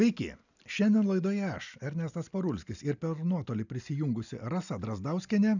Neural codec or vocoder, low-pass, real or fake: none; 7.2 kHz; real